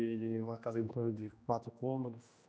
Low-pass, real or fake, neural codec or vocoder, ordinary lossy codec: none; fake; codec, 16 kHz, 1 kbps, X-Codec, HuBERT features, trained on general audio; none